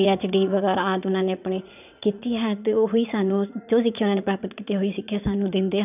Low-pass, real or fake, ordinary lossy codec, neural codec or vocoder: 3.6 kHz; fake; none; vocoder, 44.1 kHz, 80 mel bands, Vocos